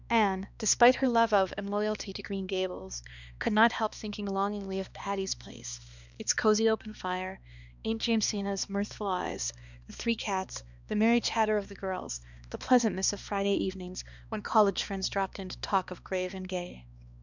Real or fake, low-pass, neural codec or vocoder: fake; 7.2 kHz; codec, 16 kHz, 2 kbps, X-Codec, HuBERT features, trained on balanced general audio